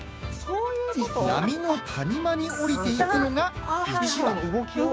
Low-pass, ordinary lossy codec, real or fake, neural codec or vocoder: none; none; fake; codec, 16 kHz, 6 kbps, DAC